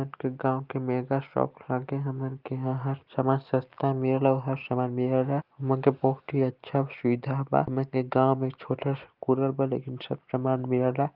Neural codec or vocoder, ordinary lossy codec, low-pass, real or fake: none; Opus, 32 kbps; 5.4 kHz; real